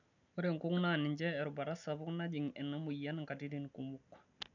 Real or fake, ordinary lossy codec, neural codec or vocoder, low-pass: real; none; none; 7.2 kHz